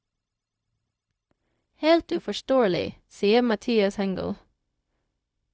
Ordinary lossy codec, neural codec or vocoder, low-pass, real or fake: none; codec, 16 kHz, 0.4 kbps, LongCat-Audio-Codec; none; fake